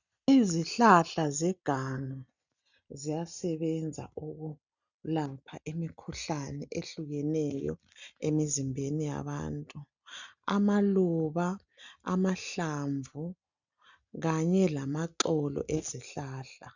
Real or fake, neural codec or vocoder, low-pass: real; none; 7.2 kHz